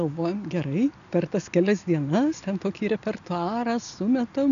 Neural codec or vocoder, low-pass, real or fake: none; 7.2 kHz; real